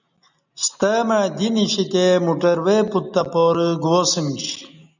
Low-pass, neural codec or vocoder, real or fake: 7.2 kHz; none; real